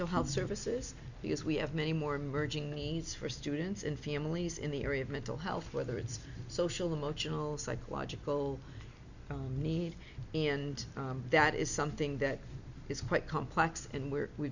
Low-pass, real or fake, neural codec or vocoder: 7.2 kHz; real; none